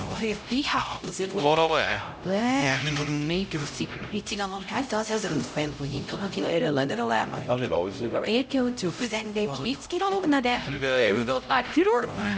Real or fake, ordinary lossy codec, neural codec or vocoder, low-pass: fake; none; codec, 16 kHz, 0.5 kbps, X-Codec, HuBERT features, trained on LibriSpeech; none